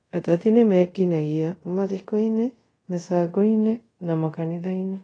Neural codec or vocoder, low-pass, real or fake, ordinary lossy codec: codec, 24 kHz, 0.5 kbps, DualCodec; 9.9 kHz; fake; AAC, 32 kbps